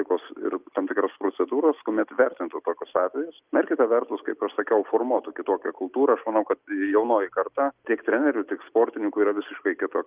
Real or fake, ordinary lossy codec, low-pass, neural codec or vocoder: real; Opus, 24 kbps; 3.6 kHz; none